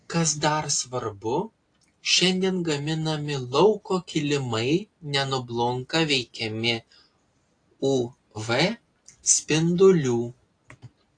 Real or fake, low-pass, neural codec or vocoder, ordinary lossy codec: real; 9.9 kHz; none; AAC, 48 kbps